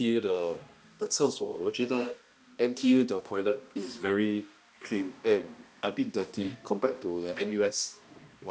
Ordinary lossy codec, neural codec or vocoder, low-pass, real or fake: none; codec, 16 kHz, 1 kbps, X-Codec, HuBERT features, trained on balanced general audio; none; fake